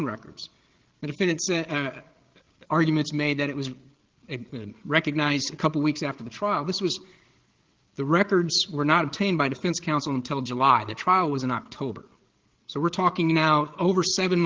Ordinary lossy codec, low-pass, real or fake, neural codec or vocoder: Opus, 16 kbps; 7.2 kHz; fake; codec, 16 kHz, 8 kbps, FreqCodec, larger model